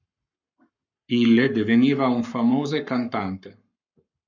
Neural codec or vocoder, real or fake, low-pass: codec, 44.1 kHz, 7.8 kbps, Pupu-Codec; fake; 7.2 kHz